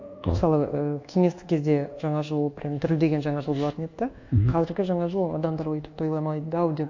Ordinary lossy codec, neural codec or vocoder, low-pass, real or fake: AAC, 48 kbps; codec, 24 kHz, 1.2 kbps, DualCodec; 7.2 kHz; fake